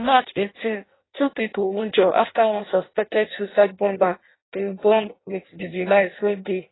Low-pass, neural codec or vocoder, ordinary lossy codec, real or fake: 7.2 kHz; codec, 16 kHz in and 24 kHz out, 0.6 kbps, FireRedTTS-2 codec; AAC, 16 kbps; fake